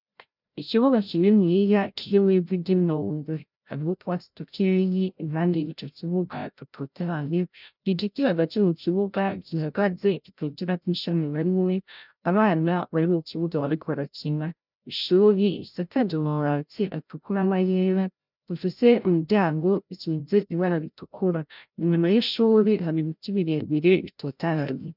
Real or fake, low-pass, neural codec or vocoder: fake; 5.4 kHz; codec, 16 kHz, 0.5 kbps, FreqCodec, larger model